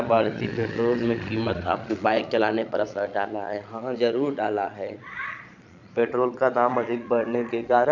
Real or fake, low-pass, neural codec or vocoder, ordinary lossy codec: fake; 7.2 kHz; vocoder, 22.05 kHz, 80 mel bands, Vocos; none